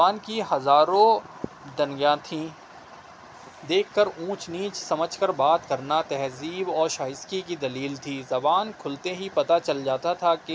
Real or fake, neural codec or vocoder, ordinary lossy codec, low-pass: real; none; none; none